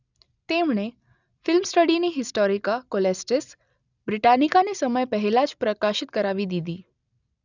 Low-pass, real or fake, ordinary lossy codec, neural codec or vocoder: 7.2 kHz; real; none; none